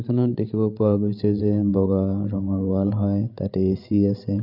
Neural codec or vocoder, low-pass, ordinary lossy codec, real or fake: codec, 16 kHz, 8 kbps, FreqCodec, larger model; 5.4 kHz; none; fake